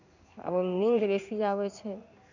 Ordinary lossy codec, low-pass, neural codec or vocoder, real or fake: none; 7.2 kHz; codec, 16 kHz in and 24 kHz out, 1 kbps, XY-Tokenizer; fake